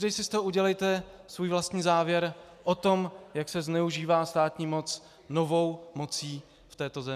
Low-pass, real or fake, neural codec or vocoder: 14.4 kHz; real; none